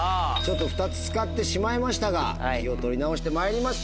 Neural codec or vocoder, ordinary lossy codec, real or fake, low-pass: none; none; real; none